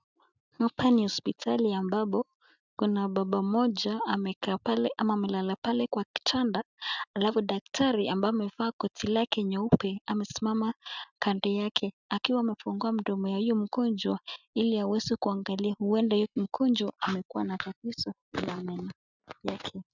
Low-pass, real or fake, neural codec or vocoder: 7.2 kHz; real; none